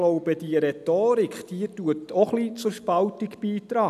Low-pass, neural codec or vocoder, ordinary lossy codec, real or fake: 14.4 kHz; none; none; real